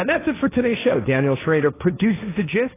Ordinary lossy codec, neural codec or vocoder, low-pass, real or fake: AAC, 16 kbps; codec, 16 kHz, 1.1 kbps, Voila-Tokenizer; 3.6 kHz; fake